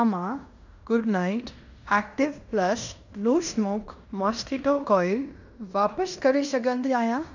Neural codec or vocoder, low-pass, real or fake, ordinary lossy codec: codec, 16 kHz in and 24 kHz out, 0.9 kbps, LongCat-Audio-Codec, fine tuned four codebook decoder; 7.2 kHz; fake; none